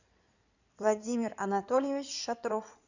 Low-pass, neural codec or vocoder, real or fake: 7.2 kHz; codec, 16 kHz in and 24 kHz out, 2.2 kbps, FireRedTTS-2 codec; fake